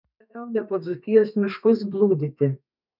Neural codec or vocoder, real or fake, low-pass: codec, 32 kHz, 1.9 kbps, SNAC; fake; 5.4 kHz